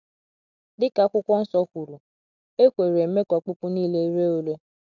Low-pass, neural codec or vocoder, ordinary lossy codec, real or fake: 7.2 kHz; none; none; real